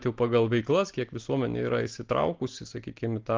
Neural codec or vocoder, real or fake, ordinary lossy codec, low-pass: none; real; Opus, 16 kbps; 7.2 kHz